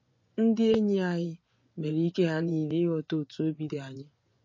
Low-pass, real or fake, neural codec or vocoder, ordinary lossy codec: 7.2 kHz; fake; vocoder, 24 kHz, 100 mel bands, Vocos; MP3, 32 kbps